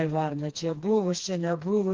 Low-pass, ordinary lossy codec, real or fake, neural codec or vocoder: 7.2 kHz; Opus, 32 kbps; fake; codec, 16 kHz, 2 kbps, FreqCodec, smaller model